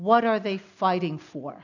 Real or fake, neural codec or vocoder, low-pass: real; none; 7.2 kHz